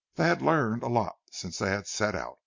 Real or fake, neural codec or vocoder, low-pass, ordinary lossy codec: real; none; 7.2 kHz; MP3, 48 kbps